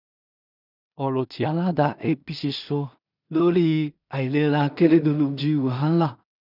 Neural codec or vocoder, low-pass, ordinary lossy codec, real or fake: codec, 16 kHz in and 24 kHz out, 0.4 kbps, LongCat-Audio-Codec, two codebook decoder; 5.4 kHz; none; fake